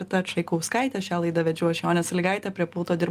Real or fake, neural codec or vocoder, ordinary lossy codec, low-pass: real; none; Opus, 32 kbps; 14.4 kHz